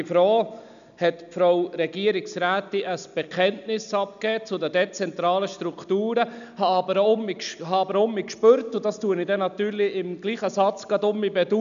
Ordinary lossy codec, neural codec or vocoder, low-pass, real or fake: none; none; 7.2 kHz; real